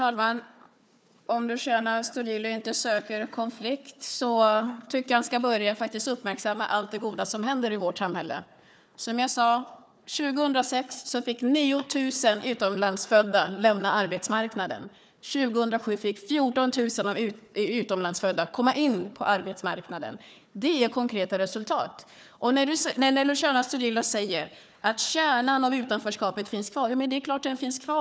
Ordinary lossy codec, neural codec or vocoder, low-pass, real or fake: none; codec, 16 kHz, 4 kbps, FunCodec, trained on Chinese and English, 50 frames a second; none; fake